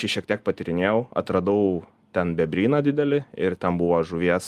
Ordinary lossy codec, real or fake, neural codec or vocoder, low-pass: Opus, 32 kbps; real; none; 14.4 kHz